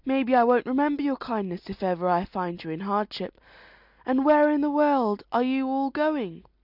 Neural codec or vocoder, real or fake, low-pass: none; real; 5.4 kHz